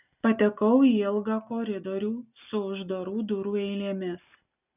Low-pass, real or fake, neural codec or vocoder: 3.6 kHz; real; none